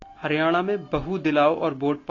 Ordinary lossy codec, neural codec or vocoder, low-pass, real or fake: AAC, 32 kbps; none; 7.2 kHz; real